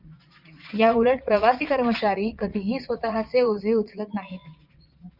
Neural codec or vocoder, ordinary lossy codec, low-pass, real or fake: vocoder, 22.05 kHz, 80 mel bands, Vocos; Opus, 64 kbps; 5.4 kHz; fake